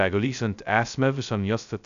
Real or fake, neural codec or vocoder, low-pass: fake; codec, 16 kHz, 0.2 kbps, FocalCodec; 7.2 kHz